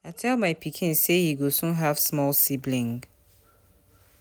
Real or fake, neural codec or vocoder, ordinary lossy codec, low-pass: real; none; none; none